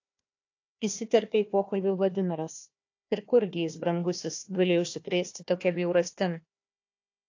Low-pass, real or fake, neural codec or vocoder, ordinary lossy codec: 7.2 kHz; fake; codec, 16 kHz, 1 kbps, FunCodec, trained on Chinese and English, 50 frames a second; AAC, 48 kbps